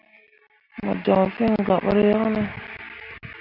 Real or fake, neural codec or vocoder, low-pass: real; none; 5.4 kHz